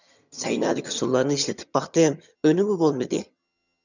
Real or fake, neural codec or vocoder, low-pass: fake; vocoder, 22.05 kHz, 80 mel bands, HiFi-GAN; 7.2 kHz